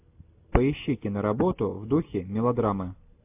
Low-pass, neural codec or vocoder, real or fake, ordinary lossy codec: 3.6 kHz; none; real; AAC, 32 kbps